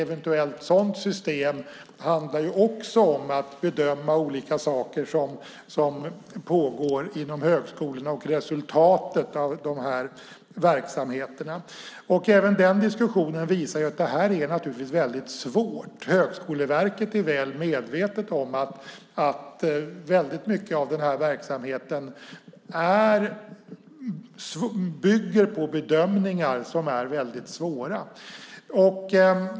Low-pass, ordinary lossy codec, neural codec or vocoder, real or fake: none; none; none; real